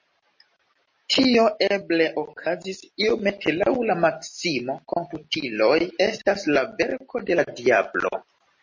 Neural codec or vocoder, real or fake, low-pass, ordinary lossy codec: none; real; 7.2 kHz; MP3, 32 kbps